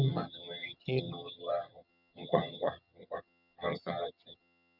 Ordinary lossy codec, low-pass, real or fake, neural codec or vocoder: none; 5.4 kHz; fake; vocoder, 22.05 kHz, 80 mel bands, HiFi-GAN